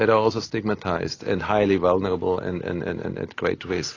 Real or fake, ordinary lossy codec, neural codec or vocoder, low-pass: real; AAC, 32 kbps; none; 7.2 kHz